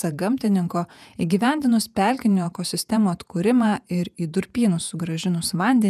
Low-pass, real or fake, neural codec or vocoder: 14.4 kHz; fake; vocoder, 48 kHz, 128 mel bands, Vocos